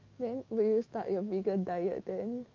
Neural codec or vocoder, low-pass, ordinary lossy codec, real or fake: autoencoder, 48 kHz, 128 numbers a frame, DAC-VAE, trained on Japanese speech; 7.2 kHz; Opus, 24 kbps; fake